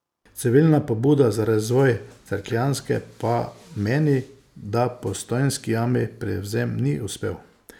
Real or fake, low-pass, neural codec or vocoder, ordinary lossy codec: real; 19.8 kHz; none; none